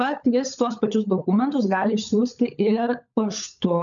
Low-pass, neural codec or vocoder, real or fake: 7.2 kHz; codec, 16 kHz, 16 kbps, FunCodec, trained on LibriTTS, 50 frames a second; fake